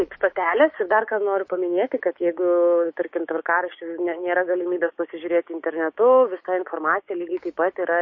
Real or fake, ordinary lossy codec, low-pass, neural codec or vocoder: fake; MP3, 32 kbps; 7.2 kHz; codec, 16 kHz, 6 kbps, DAC